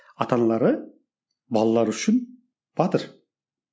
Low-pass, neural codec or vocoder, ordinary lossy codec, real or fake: none; none; none; real